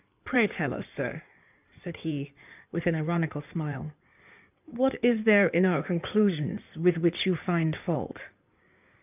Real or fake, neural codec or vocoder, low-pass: fake; codec, 16 kHz in and 24 kHz out, 2.2 kbps, FireRedTTS-2 codec; 3.6 kHz